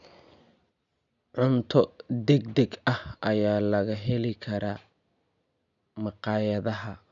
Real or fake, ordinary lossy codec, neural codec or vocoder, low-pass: real; none; none; 7.2 kHz